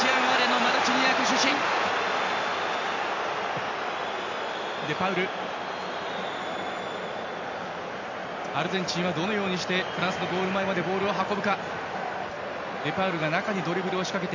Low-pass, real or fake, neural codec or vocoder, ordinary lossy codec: 7.2 kHz; real; none; MP3, 48 kbps